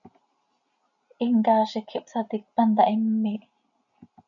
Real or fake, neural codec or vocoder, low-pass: real; none; 7.2 kHz